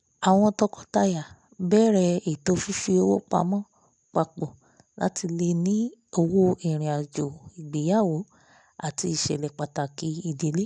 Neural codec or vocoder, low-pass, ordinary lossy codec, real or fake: none; 10.8 kHz; none; real